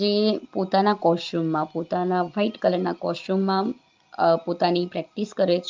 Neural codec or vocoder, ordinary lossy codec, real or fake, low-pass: none; Opus, 24 kbps; real; 7.2 kHz